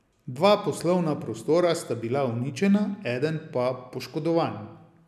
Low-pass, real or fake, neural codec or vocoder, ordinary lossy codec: 14.4 kHz; real; none; none